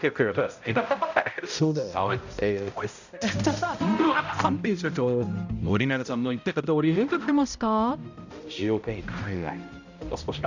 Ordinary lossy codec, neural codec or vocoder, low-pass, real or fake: none; codec, 16 kHz, 0.5 kbps, X-Codec, HuBERT features, trained on balanced general audio; 7.2 kHz; fake